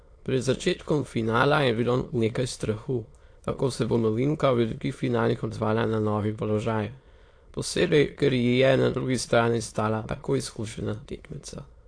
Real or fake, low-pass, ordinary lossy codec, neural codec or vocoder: fake; 9.9 kHz; AAC, 48 kbps; autoencoder, 22.05 kHz, a latent of 192 numbers a frame, VITS, trained on many speakers